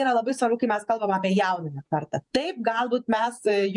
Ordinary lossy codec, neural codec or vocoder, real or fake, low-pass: MP3, 96 kbps; vocoder, 48 kHz, 128 mel bands, Vocos; fake; 10.8 kHz